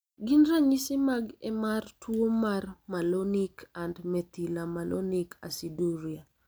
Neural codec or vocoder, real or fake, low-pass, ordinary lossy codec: none; real; none; none